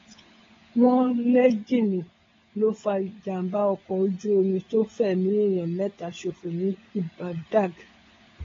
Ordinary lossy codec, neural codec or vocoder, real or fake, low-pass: AAC, 24 kbps; codec, 16 kHz, 16 kbps, FunCodec, trained on LibriTTS, 50 frames a second; fake; 7.2 kHz